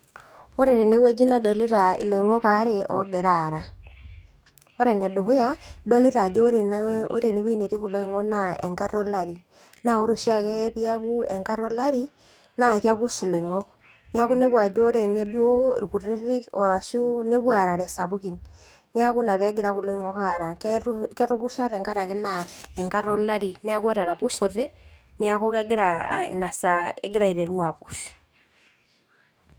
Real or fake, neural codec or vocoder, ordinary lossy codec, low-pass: fake; codec, 44.1 kHz, 2.6 kbps, DAC; none; none